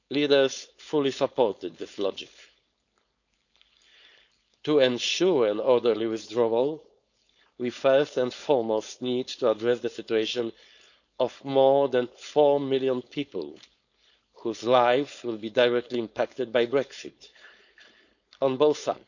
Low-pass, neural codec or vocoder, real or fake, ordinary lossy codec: 7.2 kHz; codec, 16 kHz, 4.8 kbps, FACodec; fake; none